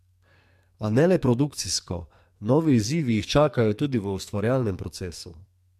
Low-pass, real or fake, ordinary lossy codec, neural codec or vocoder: 14.4 kHz; fake; MP3, 96 kbps; codec, 44.1 kHz, 2.6 kbps, SNAC